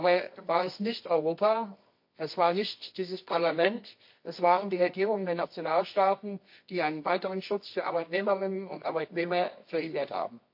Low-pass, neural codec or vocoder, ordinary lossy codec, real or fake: 5.4 kHz; codec, 24 kHz, 0.9 kbps, WavTokenizer, medium music audio release; MP3, 32 kbps; fake